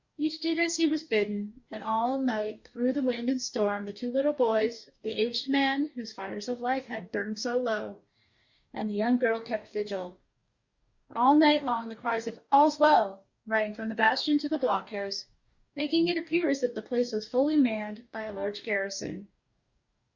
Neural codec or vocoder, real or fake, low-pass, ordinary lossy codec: codec, 44.1 kHz, 2.6 kbps, DAC; fake; 7.2 kHz; Opus, 64 kbps